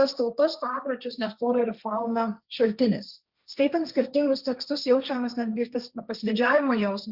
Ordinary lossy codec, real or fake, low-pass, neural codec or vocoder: Opus, 64 kbps; fake; 5.4 kHz; codec, 16 kHz, 1.1 kbps, Voila-Tokenizer